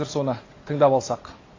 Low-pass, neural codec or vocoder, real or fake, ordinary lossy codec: 7.2 kHz; none; real; AAC, 32 kbps